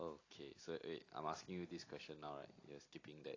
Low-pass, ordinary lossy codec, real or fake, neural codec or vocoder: 7.2 kHz; AAC, 32 kbps; real; none